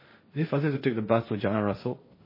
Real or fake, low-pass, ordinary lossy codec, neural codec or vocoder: fake; 5.4 kHz; MP3, 24 kbps; codec, 16 kHz, 1.1 kbps, Voila-Tokenizer